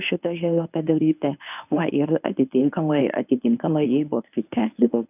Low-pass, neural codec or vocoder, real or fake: 3.6 kHz; codec, 24 kHz, 0.9 kbps, WavTokenizer, medium speech release version 1; fake